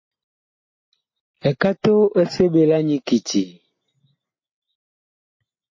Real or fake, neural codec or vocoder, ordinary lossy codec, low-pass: real; none; MP3, 32 kbps; 7.2 kHz